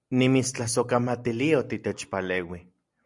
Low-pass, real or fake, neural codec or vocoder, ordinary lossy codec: 10.8 kHz; real; none; MP3, 96 kbps